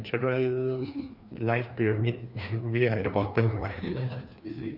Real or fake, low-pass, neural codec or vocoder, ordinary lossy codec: fake; 5.4 kHz; codec, 16 kHz, 2 kbps, FreqCodec, larger model; none